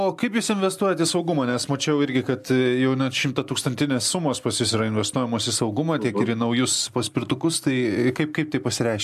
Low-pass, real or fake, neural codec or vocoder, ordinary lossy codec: 14.4 kHz; real; none; AAC, 96 kbps